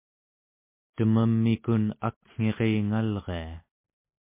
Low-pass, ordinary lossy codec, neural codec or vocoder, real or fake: 3.6 kHz; MP3, 24 kbps; none; real